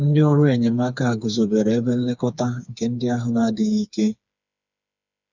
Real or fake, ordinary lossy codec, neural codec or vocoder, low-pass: fake; none; codec, 16 kHz, 4 kbps, FreqCodec, smaller model; 7.2 kHz